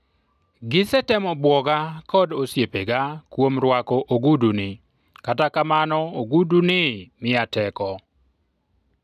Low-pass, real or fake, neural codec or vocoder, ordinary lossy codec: 14.4 kHz; real; none; none